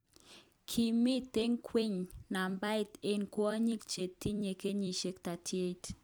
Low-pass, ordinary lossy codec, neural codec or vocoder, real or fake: none; none; vocoder, 44.1 kHz, 128 mel bands every 256 samples, BigVGAN v2; fake